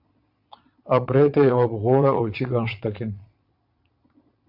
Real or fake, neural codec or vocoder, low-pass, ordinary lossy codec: fake; vocoder, 22.05 kHz, 80 mel bands, Vocos; 5.4 kHz; MP3, 48 kbps